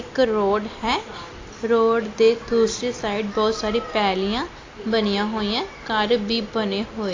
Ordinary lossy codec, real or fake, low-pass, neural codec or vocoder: AAC, 32 kbps; real; 7.2 kHz; none